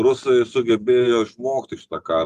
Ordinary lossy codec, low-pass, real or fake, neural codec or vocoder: Opus, 32 kbps; 14.4 kHz; real; none